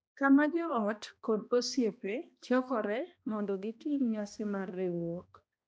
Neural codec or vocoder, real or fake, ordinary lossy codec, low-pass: codec, 16 kHz, 1 kbps, X-Codec, HuBERT features, trained on balanced general audio; fake; none; none